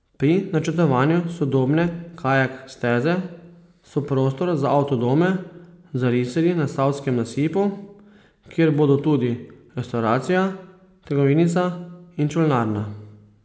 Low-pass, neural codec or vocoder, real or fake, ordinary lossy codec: none; none; real; none